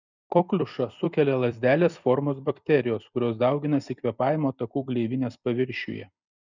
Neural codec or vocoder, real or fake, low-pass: vocoder, 44.1 kHz, 128 mel bands every 256 samples, BigVGAN v2; fake; 7.2 kHz